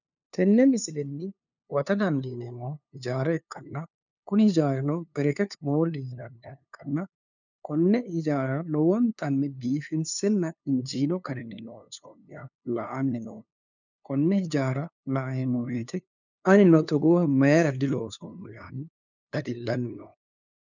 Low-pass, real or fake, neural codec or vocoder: 7.2 kHz; fake; codec, 16 kHz, 2 kbps, FunCodec, trained on LibriTTS, 25 frames a second